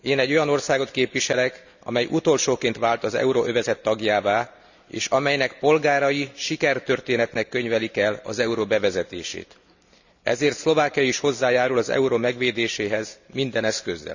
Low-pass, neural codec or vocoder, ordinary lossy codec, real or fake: 7.2 kHz; none; none; real